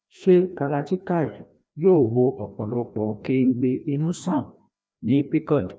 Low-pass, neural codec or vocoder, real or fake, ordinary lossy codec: none; codec, 16 kHz, 1 kbps, FreqCodec, larger model; fake; none